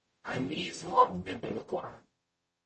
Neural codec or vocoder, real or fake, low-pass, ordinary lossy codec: codec, 44.1 kHz, 0.9 kbps, DAC; fake; 10.8 kHz; MP3, 32 kbps